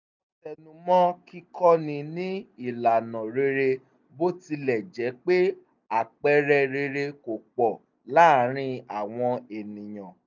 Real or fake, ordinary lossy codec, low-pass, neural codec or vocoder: real; none; 7.2 kHz; none